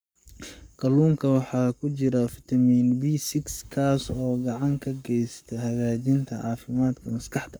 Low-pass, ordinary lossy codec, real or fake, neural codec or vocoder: none; none; fake; codec, 44.1 kHz, 7.8 kbps, Pupu-Codec